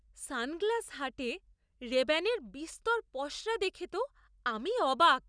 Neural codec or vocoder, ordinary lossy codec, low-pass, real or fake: none; none; 9.9 kHz; real